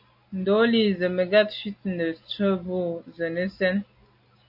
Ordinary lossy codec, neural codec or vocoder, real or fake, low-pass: AAC, 48 kbps; none; real; 5.4 kHz